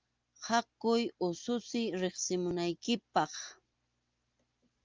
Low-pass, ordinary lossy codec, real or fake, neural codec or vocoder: 7.2 kHz; Opus, 24 kbps; real; none